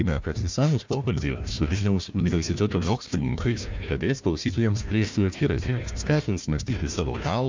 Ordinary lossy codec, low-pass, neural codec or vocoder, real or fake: MP3, 64 kbps; 7.2 kHz; codec, 16 kHz, 1 kbps, FunCodec, trained on Chinese and English, 50 frames a second; fake